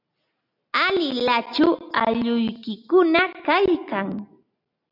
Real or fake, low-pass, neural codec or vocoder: real; 5.4 kHz; none